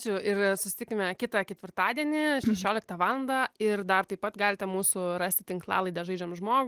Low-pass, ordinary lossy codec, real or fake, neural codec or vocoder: 14.4 kHz; Opus, 24 kbps; real; none